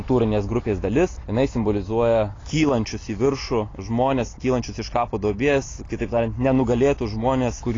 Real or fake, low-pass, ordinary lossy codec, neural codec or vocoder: real; 7.2 kHz; AAC, 32 kbps; none